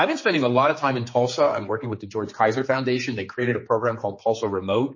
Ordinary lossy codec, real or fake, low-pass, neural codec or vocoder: MP3, 32 kbps; fake; 7.2 kHz; codec, 16 kHz, 4 kbps, X-Codec, HuBERT features, trained on general audio